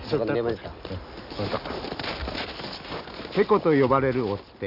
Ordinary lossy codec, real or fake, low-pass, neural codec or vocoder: none; real; 5.4 kHz; none